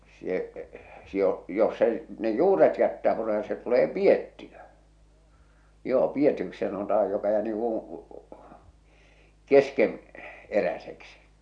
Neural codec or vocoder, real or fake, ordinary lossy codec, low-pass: none; real; MP3, 96 kbps; 9.9 kHz